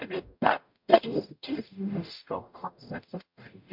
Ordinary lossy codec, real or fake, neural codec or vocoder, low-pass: MP3, 48 kbps; fake; codec, 44.1 kHz, 0.9 kbps, DAC; 5.4 kHz